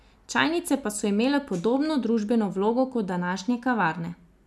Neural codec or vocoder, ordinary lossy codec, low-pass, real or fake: none; none; none; real